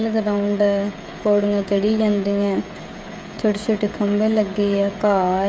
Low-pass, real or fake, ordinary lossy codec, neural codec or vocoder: none; fake; none; codec, 16 kHz, 16 kbps, FreqCodec, smaller model